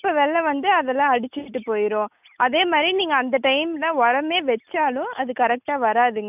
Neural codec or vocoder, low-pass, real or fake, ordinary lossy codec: none; 3.6 kHz; real; none